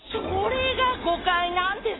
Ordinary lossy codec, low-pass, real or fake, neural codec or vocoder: AAC, 16 kbps; 7.2 kHz; real; none